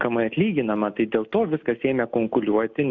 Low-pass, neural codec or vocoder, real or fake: 7.2 kHz; none; real